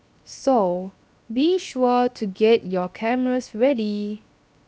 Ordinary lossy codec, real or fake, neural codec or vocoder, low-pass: none; fake; codec, 16 kHz, 0.7 kbps, FocalCodec; none